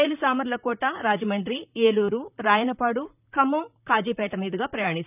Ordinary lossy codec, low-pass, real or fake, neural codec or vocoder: none; 3.6 kHz; fake; vocoder, 44.1 kHz, 128 mel bands, Pupu-Vocoder